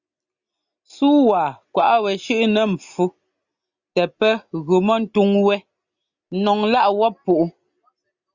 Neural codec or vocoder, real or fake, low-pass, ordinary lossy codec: none; real; 7.2 kHz; Opus, 64 kbps